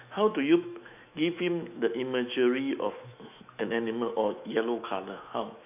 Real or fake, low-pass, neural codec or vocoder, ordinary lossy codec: fake; 3.6 kHz; autoencoder, 48 kHz, 128 numbers a frame, DAC-VAE, trained on Japanese speech; none